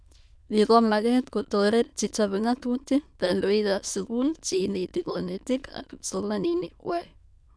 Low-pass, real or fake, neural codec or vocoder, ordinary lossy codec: none; fake; autoencoder, 22.05 kHz, a latent of 192 numbers a frame, VITS, trained on many speakers; none